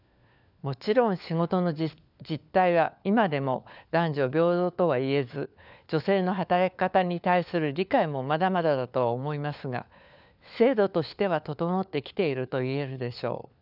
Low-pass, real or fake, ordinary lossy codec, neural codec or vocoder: 5.4 kHz; fake; none; codec, 16 kHz, 4 kbps, FunCodec, trained on LibriTTS, 50 frames a second